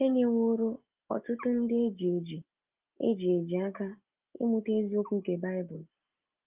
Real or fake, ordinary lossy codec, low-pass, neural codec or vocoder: real; Opus, 32 kbps; 3.6 kHz; none